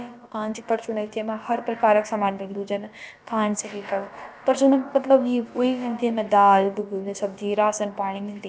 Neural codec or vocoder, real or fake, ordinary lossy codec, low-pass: codec, 16 kHz, about 1 kbps, DyCAST, with the encoder's durations; fake; none; none